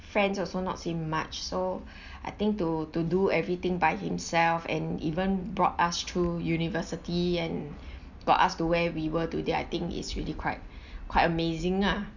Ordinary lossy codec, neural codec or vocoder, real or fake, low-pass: none; none; real; 7.2 kHz